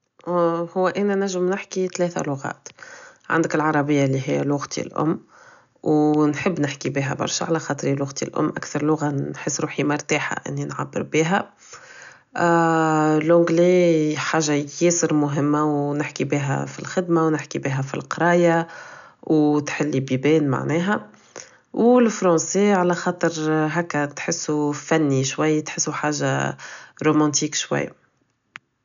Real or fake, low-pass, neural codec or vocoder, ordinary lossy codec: real; 7.2 kHz; none; none